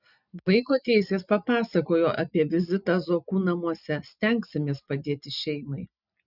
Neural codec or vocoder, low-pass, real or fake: none; 5.4 kHz; real